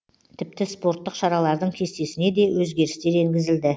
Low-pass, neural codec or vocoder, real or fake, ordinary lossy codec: none; none; real; none